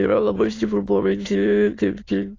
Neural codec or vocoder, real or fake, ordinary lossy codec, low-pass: autoencoder, 22.05 kHz, a latent of 192 numbers a frame, VITS, trained on many speakers; fake; AAC, 32 kbps; 7.2 kHz